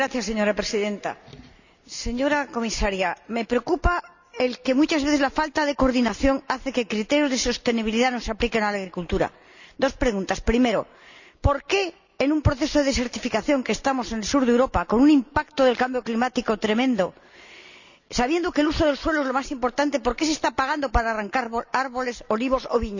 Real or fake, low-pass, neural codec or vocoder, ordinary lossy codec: real; 7.2 kHz; none; none